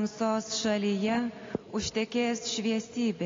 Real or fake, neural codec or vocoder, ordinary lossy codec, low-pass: real; none; AAC, 32 kbps; 7.2 kHz